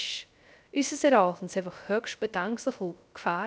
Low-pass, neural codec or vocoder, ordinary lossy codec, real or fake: none; codec, 16 kHz, 0.3 kbps, FocalCodec; none; fake